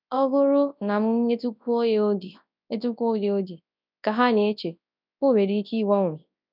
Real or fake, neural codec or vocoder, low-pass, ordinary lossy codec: fake; codec, 24 kHz, 0.9 kbps, WavTokenizer, large speech release; 5.4 kHz; none